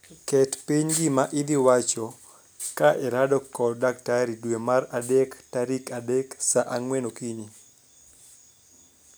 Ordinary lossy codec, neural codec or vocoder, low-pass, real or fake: none; none; none; real